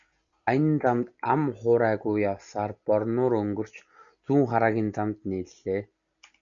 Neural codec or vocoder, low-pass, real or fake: none; 7.2 kHz; real